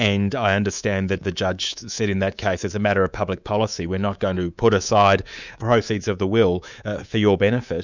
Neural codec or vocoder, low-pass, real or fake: codec, 24 kHz, 3.1 kbps, DualCodec; 7.2 kHz; fake